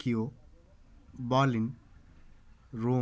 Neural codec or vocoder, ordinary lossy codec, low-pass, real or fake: none; none; none; real